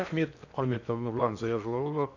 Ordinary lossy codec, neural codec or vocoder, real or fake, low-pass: none; codec, 16 kHz in and 24 kHz out, 0.8 kbps, FocalCodec, streaming, 65536 codes; fake; 7.2 kHz